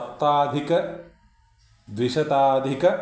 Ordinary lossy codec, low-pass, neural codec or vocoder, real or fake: none; none; none; real